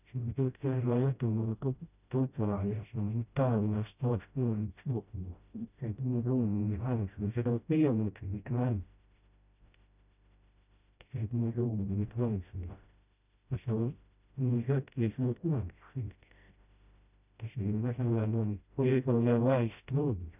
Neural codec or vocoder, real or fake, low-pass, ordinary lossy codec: codec, 16 kHz, 0.5 kbps, FreqCodec, smaller model; fake; 3.6 kHz; none